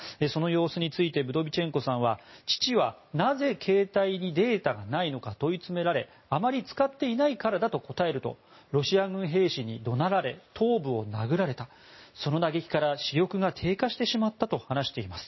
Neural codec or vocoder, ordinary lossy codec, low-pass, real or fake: none; MP3, 24 kbps; 7.2 kHz; real